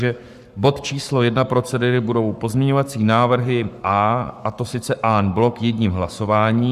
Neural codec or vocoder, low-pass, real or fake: codec, 44.1 kHz, 7.8 kbps, Pupu-Codec; 14.4 kHz; fake